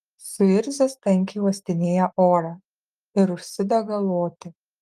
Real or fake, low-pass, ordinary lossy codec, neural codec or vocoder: real; 14.4 kHz; Opus, 32 kbps; none